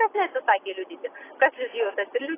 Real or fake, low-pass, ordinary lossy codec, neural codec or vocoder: real; 3.6 kHz; AAC, 16 kbps; none